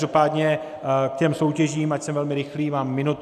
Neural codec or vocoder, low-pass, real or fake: none; 14.4 kHz; real